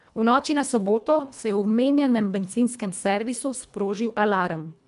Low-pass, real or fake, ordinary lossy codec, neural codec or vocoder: 10.8 kHz; fake; AAC, 96 kbps; codec, 24 kHz, 1.5 kbps, HILCodec